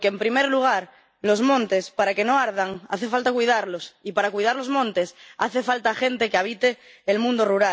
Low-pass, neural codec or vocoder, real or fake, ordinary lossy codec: none; none; real; none